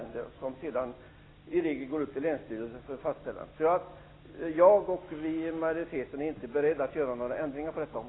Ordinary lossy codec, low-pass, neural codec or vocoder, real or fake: AAC, 16 kbps; 7.2 kHz; none; real